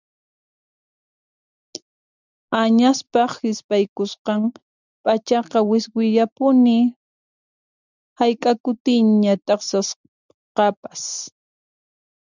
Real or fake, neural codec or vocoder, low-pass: real; none; 7.2 kHz